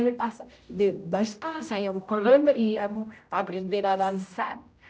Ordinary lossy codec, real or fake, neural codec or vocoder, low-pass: none; fake; codec, 16 kHz, 0.5 kbps, X-Codec, HuBERT features, trained on general audio; none